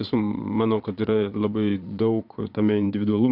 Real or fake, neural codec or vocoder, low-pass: fake; vocoder, 22.05 kHz, 80 mel bands, Vocos; 5.4 kHz